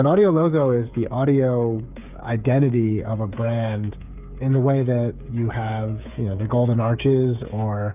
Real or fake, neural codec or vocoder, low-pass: fake; codec, 16 kHz, 8 kbps, FreqCodec, smaller model; 3.6 kHz